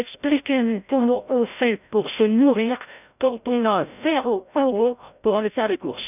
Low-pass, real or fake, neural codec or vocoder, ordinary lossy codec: 3.6 kHz; fake; codec, 16 kHz, 0.5 kbps, FreqCodec, larger model; none